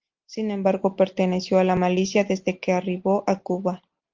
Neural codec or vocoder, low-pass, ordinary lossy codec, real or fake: none; 7.2 kHz; Opus, 32 kbps; real